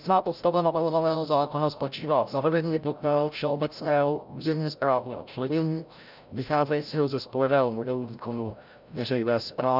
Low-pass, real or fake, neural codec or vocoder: 5.4 kHz; fake; codec, 16 kHz, 0.5 kbps, FreqCodec, larger model